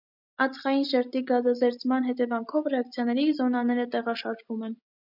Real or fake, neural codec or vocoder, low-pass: real; none; 5.4 kHz